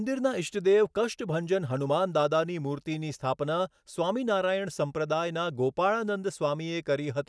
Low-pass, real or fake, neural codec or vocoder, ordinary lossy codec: none; real; none; none